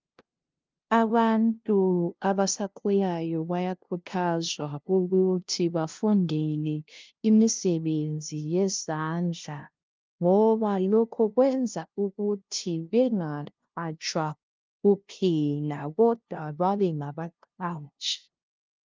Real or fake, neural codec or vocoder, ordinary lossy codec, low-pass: fake; codec, 16 kHz, 0.5 kbps, FunCodec, trained on LibriTTS, 25 frames a second; Opus, 32 kbps; 7.2 kHz